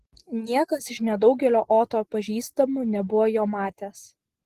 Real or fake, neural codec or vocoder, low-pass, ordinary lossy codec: real; none; 14.4 kHz; Opus, 16 kbps